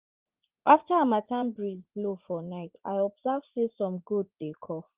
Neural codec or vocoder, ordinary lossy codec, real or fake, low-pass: vocoder, 44.1 kHz, 80 mel bands, Vocos; Opus, 32 kbps; fake; 3.6 kHz